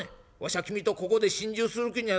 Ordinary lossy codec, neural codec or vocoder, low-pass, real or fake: none; none; none; real